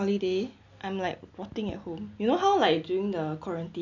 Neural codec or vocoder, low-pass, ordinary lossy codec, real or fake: none; 7.2 kHz; none; real